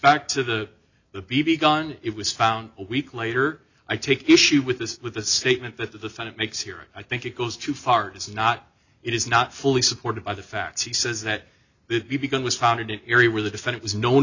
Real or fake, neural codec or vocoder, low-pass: real; none; 7.2 kHz